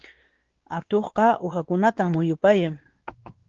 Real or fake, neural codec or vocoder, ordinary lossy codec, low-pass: fake; codec, 16 kHz, 2 kbps, X-Codec, WavLM features, trained on Multilingual LibriSpeech; Opus, 16 kbps; 7.2 kHz